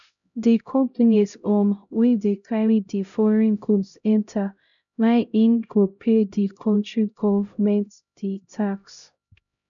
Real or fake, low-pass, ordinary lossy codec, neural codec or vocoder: fake; 7.2 kHz; none; codec, 16 kHz, 0.5 kbps, X-Codec, HuBERT features, trained on LibriSpeech